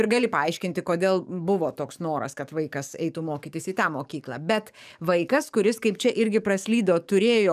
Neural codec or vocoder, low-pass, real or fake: codec, 44.1 kHz, 7.8 kbps, DAC; 14.4 kHz; fake